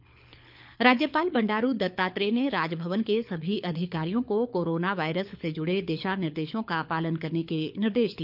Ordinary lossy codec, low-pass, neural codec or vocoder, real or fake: none; 5.4 kHz; codec, 24 kHz, 6 kbps, HILCodec; fake